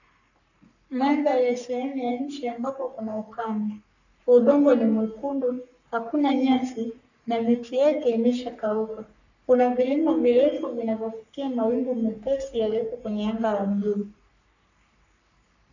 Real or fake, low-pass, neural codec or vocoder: fake; 7.2 kHz; codec, 44.1 kHz, 3.4 kbps, Pupu-Codec